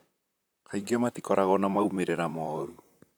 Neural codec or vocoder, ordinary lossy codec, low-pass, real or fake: vocoder, 44.1 kHz, 128 mel bands, Pupu-Vocoder; none; none; fake